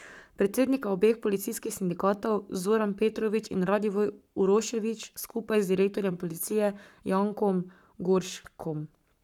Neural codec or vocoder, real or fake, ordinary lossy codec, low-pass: codec, 44.1 kHz, 7.8 kbps, Pupu-Codec; fake; none; 19.8 kHz